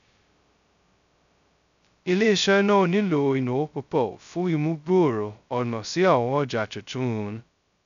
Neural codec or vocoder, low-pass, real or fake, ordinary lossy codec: codec, 16 kHz, 0.2 kbps, FocalCodec; 7.2 kHz; fake; none